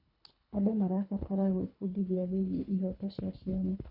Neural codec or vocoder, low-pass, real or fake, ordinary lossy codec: codec, 24 kHz, 3 kbps, HILCodec; 5.4 kHz; fake; none